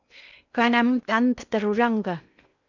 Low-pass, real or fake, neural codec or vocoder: 7.2 kHz; fake; codec, 16 kHz in and 24 kHz out, 0.6 kbps, FocalCodec, streaming, 2048 codes